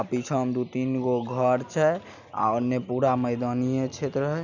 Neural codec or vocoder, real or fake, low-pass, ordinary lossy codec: none; real; 7.2 kHz; none